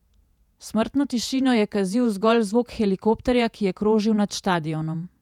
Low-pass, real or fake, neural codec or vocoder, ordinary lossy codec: 19.8 kHz; fake; vocoder, 48 kHz, 128 mel bands, Vocos; none